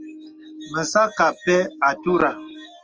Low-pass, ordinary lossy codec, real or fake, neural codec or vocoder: 7.2 kHz; Opus, 24 kbps; real; none